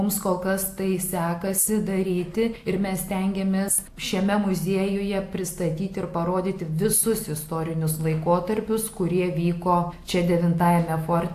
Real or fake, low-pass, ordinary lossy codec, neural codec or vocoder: real; 14.4 kHz; AAC, 64 kbps; none